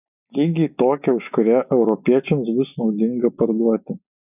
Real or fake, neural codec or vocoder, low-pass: real; none; 3.6 kHz